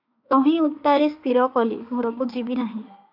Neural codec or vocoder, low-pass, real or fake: autoencoder, 48 kHz, 32 numbers a frame, DAC-VAE, trained on Japanese speech; 5.4 kHz; fake